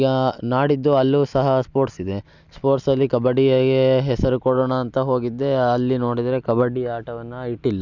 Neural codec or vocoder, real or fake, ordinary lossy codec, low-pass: none; real; none; 7.2 kHz